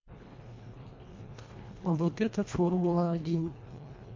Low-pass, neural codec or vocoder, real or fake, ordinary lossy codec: 7.2 kHz; codec, 24 kHz, 1.5 kbps, HILCodec; fake; MP3, 48 kbps